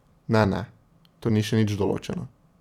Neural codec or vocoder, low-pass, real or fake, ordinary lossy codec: vocoder, 44.1 kHz, 128 mel bands every 256 samples, BigVGAN v2; 19.8 kHz; fake; none